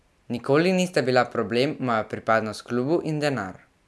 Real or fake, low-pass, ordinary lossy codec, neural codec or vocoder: real; none; none; none